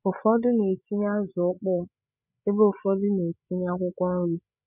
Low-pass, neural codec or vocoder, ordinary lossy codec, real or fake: 3.6 kHz; codec, 16 kHz, 8 kbps, FreqCodec, larger model; none; fake